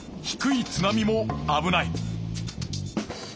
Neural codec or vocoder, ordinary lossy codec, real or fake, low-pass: none; none; real; none